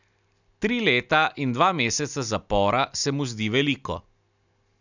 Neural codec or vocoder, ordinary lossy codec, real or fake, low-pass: none; none; real; 7.2 kHz